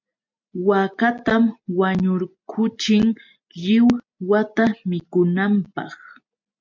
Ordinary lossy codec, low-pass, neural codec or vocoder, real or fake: MP3, 64 kbps; 7.2 kHz; none; real